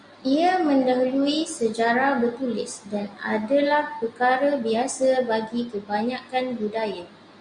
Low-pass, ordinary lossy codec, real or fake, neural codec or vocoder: 9.9 kHz; Opus, 64 kbps; real; none